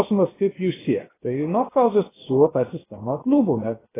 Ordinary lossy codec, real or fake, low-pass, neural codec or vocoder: AAC, 16 kbps; fake; 3.6 kHz; codec, 16 kHz, 0.7 kbps, FocalCodec